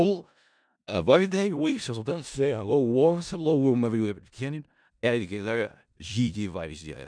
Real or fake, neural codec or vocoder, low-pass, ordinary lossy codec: fake; codec, 16 kHz in and 24 kHz out, 0.4 kbps, LongCat-Audio-Codec, four codebook decoder; 9.9 kHz; none